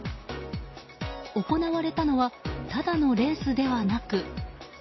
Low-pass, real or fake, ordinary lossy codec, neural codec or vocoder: 7.2 kHz; real; MP3, 24 kbps; none